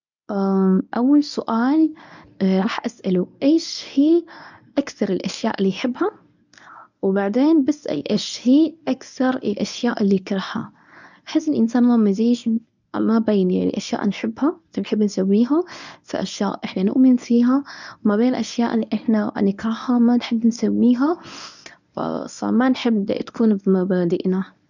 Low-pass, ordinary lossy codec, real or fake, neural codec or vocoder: 7.2 kHz; none; fake; codec, 24 kHz, 0.9 kbps, WavTokenizer, medium speech release version 1